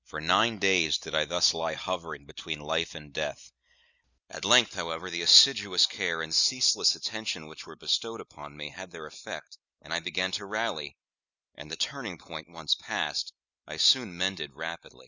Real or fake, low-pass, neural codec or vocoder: real; 7.2 kHz; none